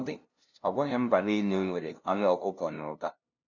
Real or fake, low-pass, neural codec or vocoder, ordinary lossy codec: fake; 7.2 kHz; codec, 16 kHz, 0.5 kbps, FunCodec, trained on LibriTTS, 25 frames a second; none